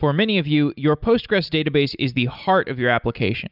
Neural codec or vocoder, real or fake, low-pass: none; real; 5.4 kHz